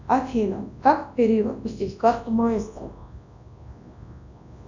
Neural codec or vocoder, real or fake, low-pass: codec, 24 kHz, 0.9 kbps, WavTokenizer, large speech release; fake; 7.2 kHz